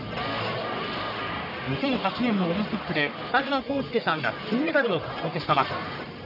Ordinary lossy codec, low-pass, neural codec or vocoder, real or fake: none; 5.4 kHz; codec, 44.1 kHz, 1.7 kbps, Pupu-Codec; fake